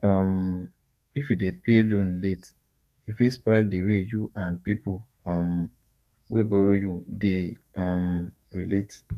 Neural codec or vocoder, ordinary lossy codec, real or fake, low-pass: codec, 32 kHz, 1.9 kbps, SNAC; none; fake; 14.4 kHz